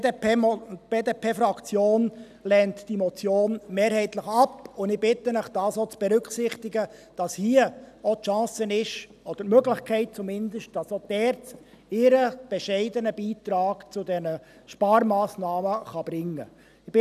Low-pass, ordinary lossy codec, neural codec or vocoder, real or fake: 14.4 kHz; none; none; real